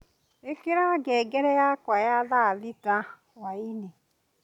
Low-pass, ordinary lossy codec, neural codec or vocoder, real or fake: 19.8 kHz; none; none; real